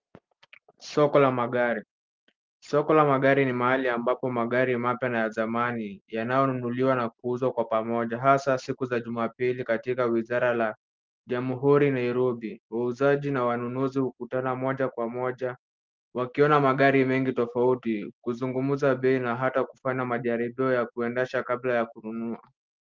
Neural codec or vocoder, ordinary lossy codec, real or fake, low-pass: none; Opus, 32 kbps; real; 7.2 kHz